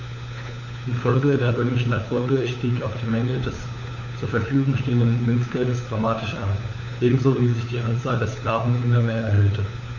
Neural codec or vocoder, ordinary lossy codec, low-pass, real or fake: codec, 16 kHz, 4 kbps, FunCodec, trained on LibriTTS, 50 frames a second; none; 7.2 kHz; fake